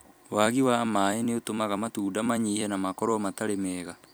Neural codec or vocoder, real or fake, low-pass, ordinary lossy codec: vocoder, 44.1 kHz, 128 mel bands every 256 samples, BigVGAN v2; fake; none; none